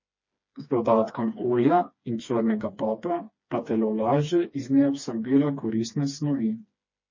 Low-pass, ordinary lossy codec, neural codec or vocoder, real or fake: 7.2 kHz; MP3, 32 kbps; codec, 16 kHz, 2 kbps, FreqCodec, smaller model; fake